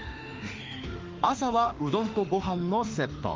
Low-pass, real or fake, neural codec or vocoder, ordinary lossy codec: 7.2 kHz; fake; autoencoder, 48 kHz, 32 numbers a frame, DAC-VAE, trained on Japanese speech; Opus, 32 kbps